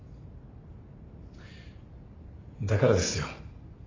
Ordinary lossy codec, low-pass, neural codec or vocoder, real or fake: AAC, 32 kbps; 7.2 kHz; none; real